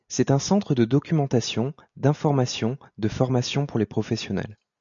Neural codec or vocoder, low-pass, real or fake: none; 7.2 kHz; real